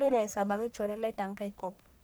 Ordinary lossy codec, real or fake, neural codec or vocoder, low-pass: none; fake; codec, 44.1 kHz, 1.7 kbps, Pupu-Codec; none